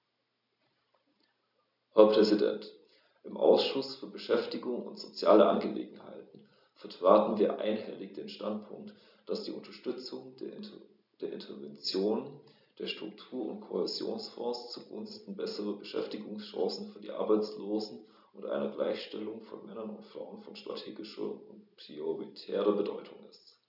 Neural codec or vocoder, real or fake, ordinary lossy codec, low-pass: none; real; none; 5.4 kHz